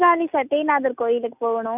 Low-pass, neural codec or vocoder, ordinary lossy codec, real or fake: 3.6 kHz; none; none; real